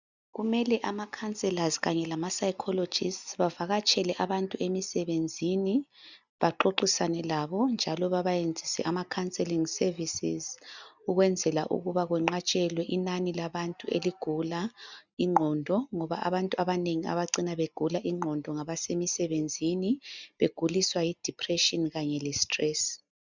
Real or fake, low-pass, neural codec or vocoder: real; 7.2 kHz; none